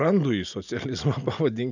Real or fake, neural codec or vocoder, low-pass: real; none; 7.2 kHz